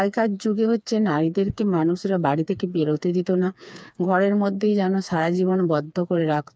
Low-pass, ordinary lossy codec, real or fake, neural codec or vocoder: none; none; fake; codec, 16 kHz, 4 kbps, FreqCodec, smaller model